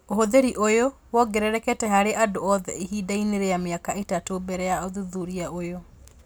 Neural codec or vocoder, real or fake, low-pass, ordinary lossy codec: none; real; none; none